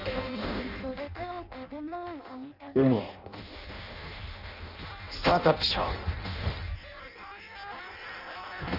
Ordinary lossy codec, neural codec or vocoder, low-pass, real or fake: none; codec, 16 kHz in and 24 kHz out, 0.6 kbps, FireRedTTS-2 codec; 5.4 kHz; fake